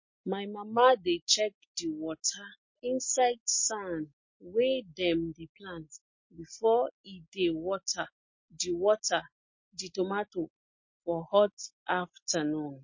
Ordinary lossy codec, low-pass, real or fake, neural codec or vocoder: MP3, 32 kbps; 7.2 kHz; real; none